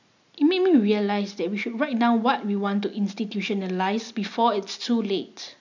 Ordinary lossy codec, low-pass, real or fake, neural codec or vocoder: none; 7.2 kHz; real; none